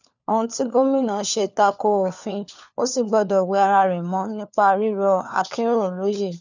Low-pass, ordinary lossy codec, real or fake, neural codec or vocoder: 7.2 kHz; none; fake; codec, 16 kHz, 4 kbps, FunCodec, trained on LibriTTS, 50 frames a second